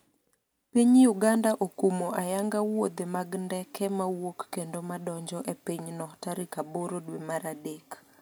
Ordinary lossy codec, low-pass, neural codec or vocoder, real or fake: none; none; none; real